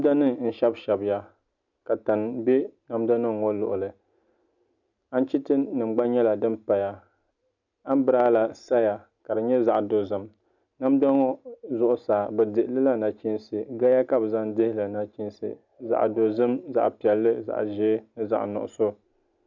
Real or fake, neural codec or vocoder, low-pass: real; none; 7.2 kHz